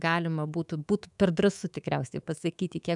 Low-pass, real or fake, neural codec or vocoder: 10.8 kHz; fake; codec, 24 kHz, 3.1 kbps, DualCodec